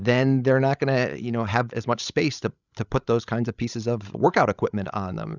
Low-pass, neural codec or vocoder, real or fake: 7.2 kHz; codec, 16 kHz, 16 kbps, FreqCodec, larger model; fake